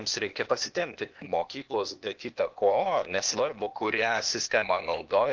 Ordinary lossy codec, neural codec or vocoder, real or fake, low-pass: Opus, 32 kbps; codec, 16 kHz, 0.8 kbps, ZipCodec; fake; 7.2 kHz